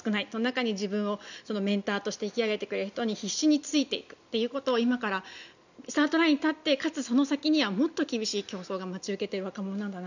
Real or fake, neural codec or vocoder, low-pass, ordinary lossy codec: real; none; 7.2 kHz; none